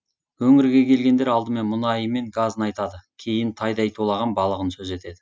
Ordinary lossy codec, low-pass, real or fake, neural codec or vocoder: none; none; real; none